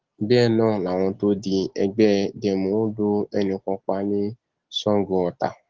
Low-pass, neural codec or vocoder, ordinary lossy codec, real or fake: 7.2 kHz; none; Opus, 16 kbps; real